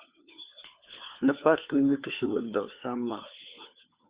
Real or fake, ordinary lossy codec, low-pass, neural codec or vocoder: fake; Opus, 64 kbps; 3.6 kHz; codec, 16 kHz, 4 kbps, FunCodec, trained on LibriTTS, 50 frames a second